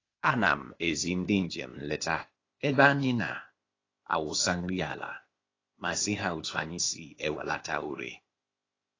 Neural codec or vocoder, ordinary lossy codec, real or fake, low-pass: codec, 16 kHz, 0.8 kbps, ZipCodec; AAC, 32 kbps; fake; 7.2 kHz